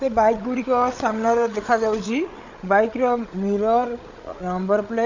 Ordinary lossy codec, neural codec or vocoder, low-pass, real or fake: none; codec, 16 kHz, 8 kbps, FreqCodec, larger model; 7.2 kHz; fake